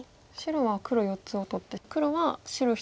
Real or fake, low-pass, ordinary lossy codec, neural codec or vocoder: real; none; none; none